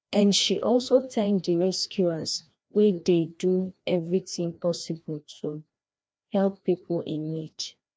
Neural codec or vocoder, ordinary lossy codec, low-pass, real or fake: codec, 16 kHz, 1 kbps, FreqCodec, larger model; none; none; fake